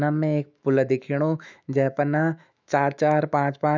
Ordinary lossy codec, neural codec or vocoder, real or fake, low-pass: none; autoencoder, 48 kHz, 128 numbers a frame, DAC-VAE, trained on Japanese speech; fake; 7.2 kHz